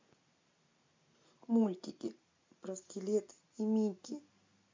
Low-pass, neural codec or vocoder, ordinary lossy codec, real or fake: 7.2 kHz; none; MP3, 48 kbps; real